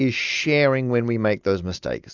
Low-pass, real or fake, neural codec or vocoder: 7.2 kHz; real; none